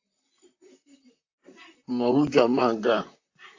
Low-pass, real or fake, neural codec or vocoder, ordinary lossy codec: 7.2 kHz; fake; vocoder, 44.1 kHz, 128 mel bands, Pupu-Vocoder; AAC, 48 kbps